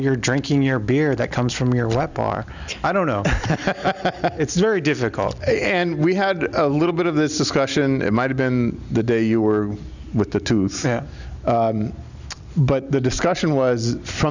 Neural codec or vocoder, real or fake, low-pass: none; real; 7.2 kHz